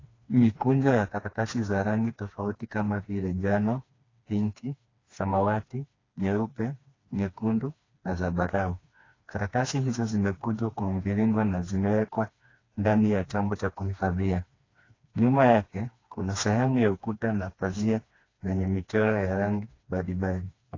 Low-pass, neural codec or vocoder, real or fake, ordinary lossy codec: 7.2 kHz; codec, 16 kHz, 2 kbps, FreqCodec, smaller model; fake; AAC, 32 kbps